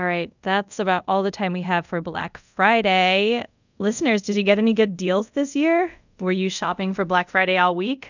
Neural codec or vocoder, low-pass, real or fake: codec, 24 kHz, 0.5 kbps, DualCodec; 7.2 kHz; fake